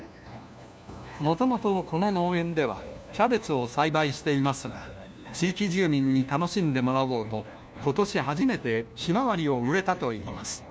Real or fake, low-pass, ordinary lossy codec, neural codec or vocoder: fake; none; none; codec, 16 kHz, 1 kbps, FunCodec, trained on LibriTTS, 50 frames a second